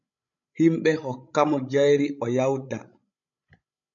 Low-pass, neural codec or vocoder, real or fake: 7.2 kHz; codec, 16 kHz, 16 kbps, FreqCodec, larger model; fake